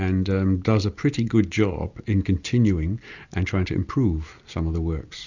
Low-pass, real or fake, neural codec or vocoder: 7.2 kHz; real; none